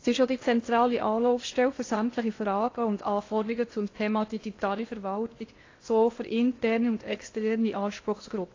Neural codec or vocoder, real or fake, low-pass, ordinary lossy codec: codec, 16 kHz in and 24 kHz out, 0.6 kbps, FocalCodec, streaming, 2048 codes; fake; 7.2 kHz; AAC, 32 kbps